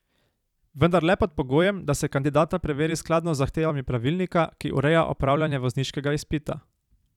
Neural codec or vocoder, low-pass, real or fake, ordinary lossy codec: vocoder, 44.1 kHz, 128 mel bands every 256 samples, BigVGAN v2; 19.8 kHz; fake; none